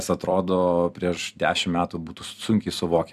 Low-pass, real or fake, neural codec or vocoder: 14.4 kHz; fake; vocoder, 44.1 kHz, 128 mel bands every 256 samples, BigVGAN v2